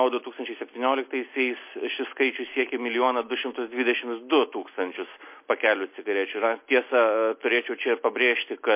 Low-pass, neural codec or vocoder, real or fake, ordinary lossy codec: 3.6 kHz; none; real; MP3, 24 kbps